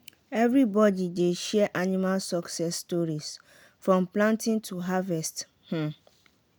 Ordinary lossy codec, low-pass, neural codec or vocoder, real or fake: none; none; none; real